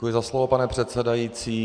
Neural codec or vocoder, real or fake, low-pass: none; real; 9.9 kHz